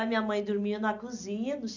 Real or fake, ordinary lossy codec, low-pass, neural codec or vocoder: real; none; 7.2 kHz; none